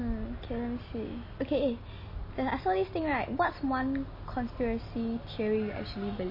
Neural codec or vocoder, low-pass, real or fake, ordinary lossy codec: none; 5.4 kHz; real; MP3, 24 kbps